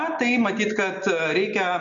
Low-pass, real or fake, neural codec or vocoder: 7.2 kHz; real; none